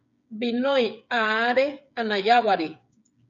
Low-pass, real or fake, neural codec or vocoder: 7.2 kHz; fake; codec, 16 kHz, 8 kbps, FreqCodec, smaller model